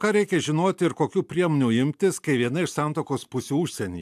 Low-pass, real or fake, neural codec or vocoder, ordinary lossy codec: 14.4 kHz; real; none; AAC, 96 kbps